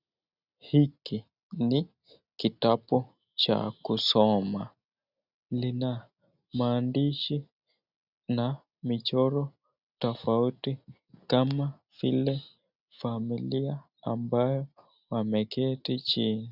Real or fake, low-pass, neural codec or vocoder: real; 5.4 kHz; none